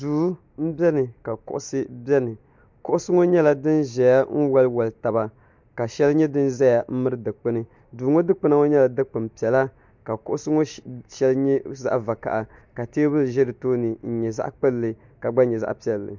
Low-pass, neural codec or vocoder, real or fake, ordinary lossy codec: 7.2 kHz; none; real; MP3, 64 kbps